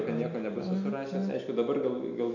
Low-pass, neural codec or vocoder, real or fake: 7.2 kHz; none; real